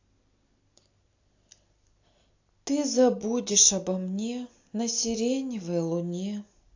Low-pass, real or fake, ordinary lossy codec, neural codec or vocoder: 7.2 kHz; real; AAC, 48 kbps; none